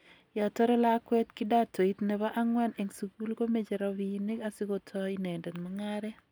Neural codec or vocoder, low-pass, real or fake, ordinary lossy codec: none; none; real; none